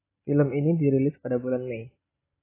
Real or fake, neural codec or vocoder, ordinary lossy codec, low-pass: real; none; AAC, 16 kbps; 3.6 kHz